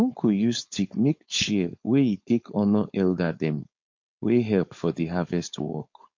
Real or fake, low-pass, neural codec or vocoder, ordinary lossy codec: fake; 7.2 kHz; codec, 16 kHz, 4.8 kbps, FACodec; MP3, 48 kbps